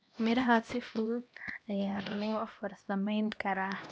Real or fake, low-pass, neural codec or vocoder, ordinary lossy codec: fake; none; codec, 16 kHz, 1 kbps, X-Codec, HuBERT features, trained on LibriSpeech; none